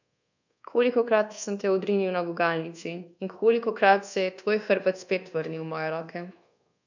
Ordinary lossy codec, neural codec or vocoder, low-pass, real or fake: none; codec, 24 kHz, 1.2 kbps, DualCodec; 7.2 kHz; fake